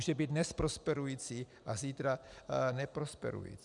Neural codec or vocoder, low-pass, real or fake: none; 10.8 kHz; real